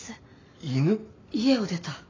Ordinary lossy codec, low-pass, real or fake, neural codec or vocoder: AAC, 32 kbps; 7.2 kHz; real; none